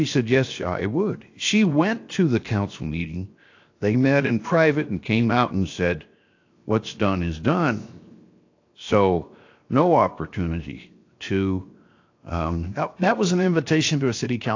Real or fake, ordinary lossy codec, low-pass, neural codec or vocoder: fake; AAC, 48 kbps; 7.2 kHz; codec, 16 kHz, 0.7 kbps, FocalCodec